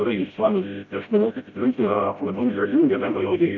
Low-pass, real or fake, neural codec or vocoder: 7.2 kHz; fake; codec, 16 kHz, 0.5 kbps, FreqCodec, smaller model